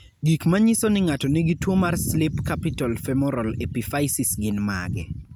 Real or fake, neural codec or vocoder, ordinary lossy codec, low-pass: real; none; none; none